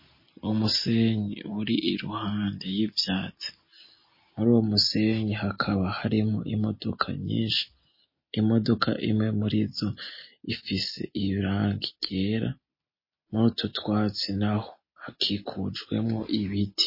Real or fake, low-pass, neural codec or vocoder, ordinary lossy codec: real; 5.4 kHz; none; MP3, 24 kbps